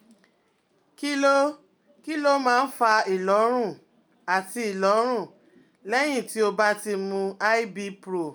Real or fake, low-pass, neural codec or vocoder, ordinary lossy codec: real; none; none; none